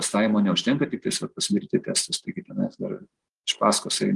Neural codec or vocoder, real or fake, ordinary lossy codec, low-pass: none; real; Opus, 24 kbps; 10.8 kHz